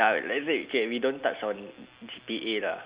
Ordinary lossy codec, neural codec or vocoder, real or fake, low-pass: Opus, 64 kbps; none; real; 3.6 kHz